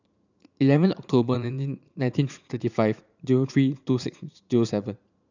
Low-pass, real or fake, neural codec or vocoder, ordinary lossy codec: 7.2 kHz; fake; vocoder, 44.1 kHz, 80 mel bands, Vocos; none